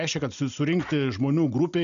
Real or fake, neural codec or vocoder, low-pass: real; none; 7.2 kHz